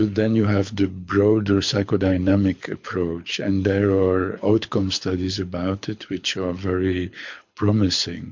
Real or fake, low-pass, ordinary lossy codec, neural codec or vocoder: fake; 7.2 kHz; MP3, 48 kbps; codec, 24 kHz, 6 kbps, HILCodec